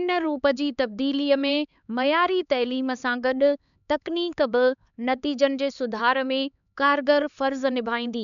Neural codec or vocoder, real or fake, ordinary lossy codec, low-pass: codec, 16 kHz, 4 kbps, X-Codec, HuBERT features, trained on LibriSpeech; fake; MP3, 96 kbps; 7.2 kHz